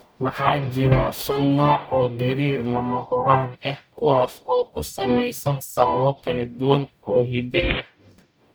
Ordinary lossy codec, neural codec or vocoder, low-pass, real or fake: none; codec, 44.1 kHz, 0.9 kbps, DAC; none; fake